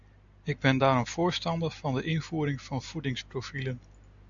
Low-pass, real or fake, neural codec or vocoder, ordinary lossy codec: 7.2 kHz; real; none; AAC, 64 kbps